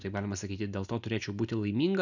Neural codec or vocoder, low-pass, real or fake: none; 7.2 kHz; real